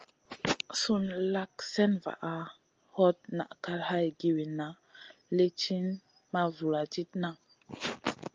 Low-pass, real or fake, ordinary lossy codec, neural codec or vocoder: 7.2 kHz; real; Opus, 24 kbps; none